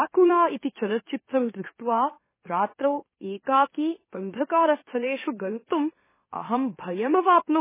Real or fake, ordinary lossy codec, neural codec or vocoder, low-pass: fake; MP3, 16 kbps; autoencoder, 44.1 kHz, a latent of 192 numbers a frame, MeloTTS; 3.6 kHz